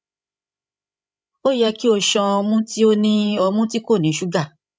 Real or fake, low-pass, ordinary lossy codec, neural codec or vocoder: fake; none; none; codec, 16 kHz, 16 kbps, FreqCodec, larger model